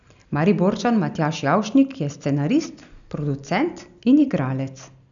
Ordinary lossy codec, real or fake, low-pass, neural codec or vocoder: none; real; 7.2 kHz; none